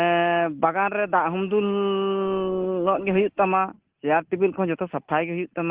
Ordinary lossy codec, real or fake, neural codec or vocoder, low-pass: Opus, 16 kbps; real; none; 3.6 kHz